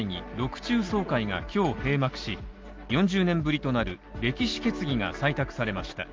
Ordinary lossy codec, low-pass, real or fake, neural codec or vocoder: Opus, 24 kbps; 7.2 kHz; real; none